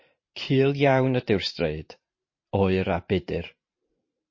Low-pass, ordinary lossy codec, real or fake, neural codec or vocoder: 7.2 kHz; MP3, 32 kbps; fake; vocoder, 44.1 kHz, 128 mel bands every 512 samples, BigVGAN v2